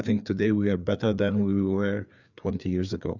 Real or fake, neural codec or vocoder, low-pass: fake; codec, 16 kHz, 4 kbps, FreqCodec, larger model; 7.2 kHz